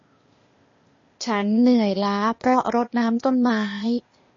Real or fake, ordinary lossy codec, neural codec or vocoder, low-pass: fake; MP3, 32 kbps; codec, 16 kHz, 0.8 kbps, ZipCodec; 7.2 kHz